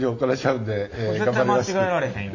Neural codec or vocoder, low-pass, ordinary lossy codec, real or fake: none; 7.2 kHz; none; real